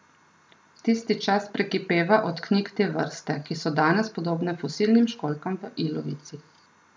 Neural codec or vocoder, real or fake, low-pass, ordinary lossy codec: none; real; none; none